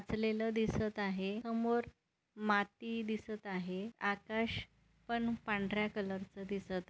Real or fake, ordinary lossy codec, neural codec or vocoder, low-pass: real; none; none; none